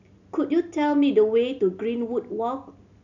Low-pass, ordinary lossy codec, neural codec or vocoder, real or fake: 7.2 kHz; none; none; real